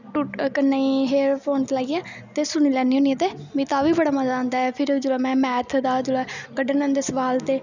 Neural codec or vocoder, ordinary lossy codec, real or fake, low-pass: none; none; real; 7.2 kHz